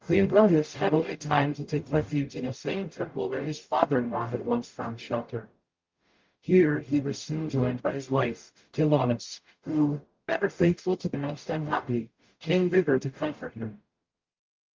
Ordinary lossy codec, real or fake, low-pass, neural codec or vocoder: Opus, 24 kbps; fake; 7.2 kHz; codec, 44.1 kHz, 0.9 kbps, DAC